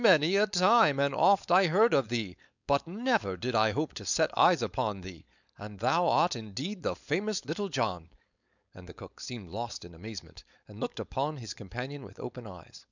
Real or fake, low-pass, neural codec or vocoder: fake; 7.2 kHz; codec, 16 kHz, 4.8 kbps, FACodec